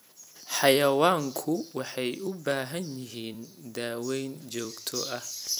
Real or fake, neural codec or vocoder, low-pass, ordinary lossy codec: fake; vocoder, 44.1 kHz, 128 mel bands every 256 samples, BigVGAN v2; none; none